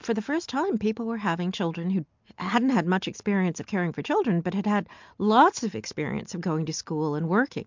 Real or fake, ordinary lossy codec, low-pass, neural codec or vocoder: real; MP3, 64 kbps; 7.2 kHz; none